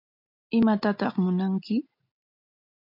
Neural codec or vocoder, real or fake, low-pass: none; real; 5.4 kHz